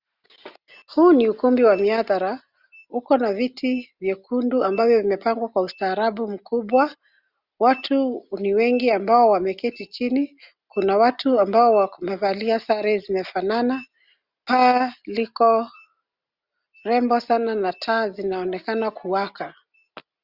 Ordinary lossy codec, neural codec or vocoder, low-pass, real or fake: Opus, 64 kbps; none; 5.4 kHz; real